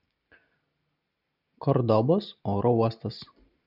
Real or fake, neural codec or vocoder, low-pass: real; none; 5.4 kHz